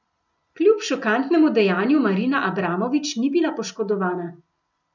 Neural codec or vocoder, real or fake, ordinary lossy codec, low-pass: none; real; none; 7.2 kHz